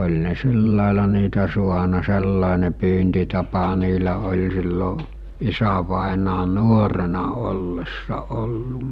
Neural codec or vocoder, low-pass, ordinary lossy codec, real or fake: vocoder, 44.1 kHz, 128 mel bands every 512 samples, BigVGAN v2; 14.4 kHz; none; fake